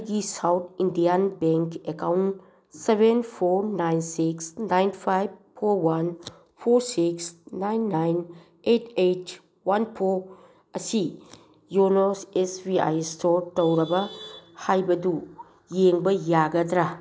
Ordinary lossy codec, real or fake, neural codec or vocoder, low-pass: none; real; none; none